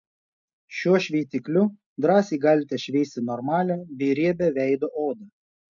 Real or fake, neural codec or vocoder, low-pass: real; none; 7.2 kHz